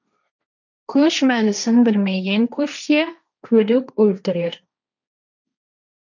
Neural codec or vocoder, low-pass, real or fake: codec, 16 kHz, 1.1 kbps, Voila-Tokenizer; 7.2 kHz; fake